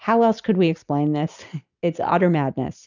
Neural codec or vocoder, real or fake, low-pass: none; real; 7.2 kHz